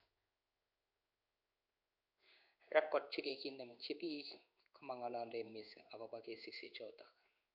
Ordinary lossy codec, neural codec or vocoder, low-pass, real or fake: none; codec, 16 kHz in and 24 kHz out, 1 kbps, XY-Tokenizer; 5.4 kHz; fake